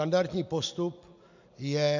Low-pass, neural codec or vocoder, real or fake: 7.2 kHz; none; real